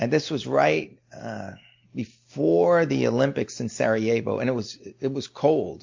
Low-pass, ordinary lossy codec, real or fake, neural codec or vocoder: 7.2 kHz; MP3, 48 kbps; real; none